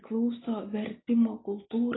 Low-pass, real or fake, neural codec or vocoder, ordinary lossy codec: 7.2 kHz; real; none; AAC, 16 kbps